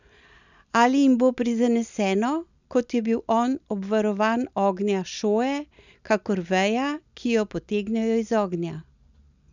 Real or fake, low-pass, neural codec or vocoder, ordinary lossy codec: real; 7.2 kHz; none; none